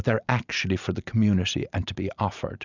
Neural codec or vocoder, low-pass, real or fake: none; 7.2 kHz; real